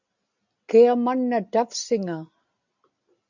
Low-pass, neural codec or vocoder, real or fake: 7.2 kHz; none; real